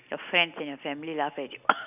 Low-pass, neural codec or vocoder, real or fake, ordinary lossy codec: 3.6 kHz; none; real; none